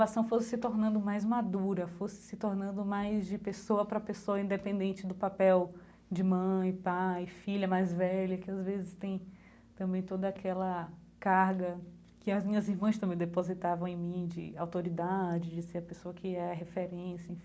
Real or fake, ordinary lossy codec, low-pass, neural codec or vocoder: real; none; none; none